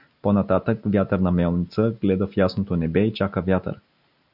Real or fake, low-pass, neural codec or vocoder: real; 5.4 kHz; none